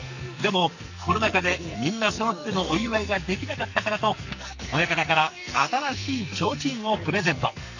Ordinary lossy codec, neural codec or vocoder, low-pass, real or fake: none; codec, 44.1 kHz, 2.6 kbps, SNAC; 7.2 kHz; fake